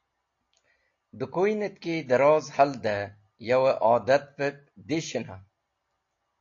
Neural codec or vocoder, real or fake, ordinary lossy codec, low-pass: none; real; AAC, 48 kbps; 7.2 kHz